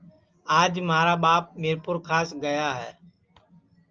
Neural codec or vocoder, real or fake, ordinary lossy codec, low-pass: none; real; Opus, 24 kbps; 7.2 kHz